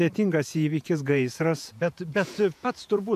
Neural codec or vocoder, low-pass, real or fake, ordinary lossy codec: vocoder, 48 kHz, 128 mel bands, Vocos; 14.4 kHz; fake; AAC, 96 kbps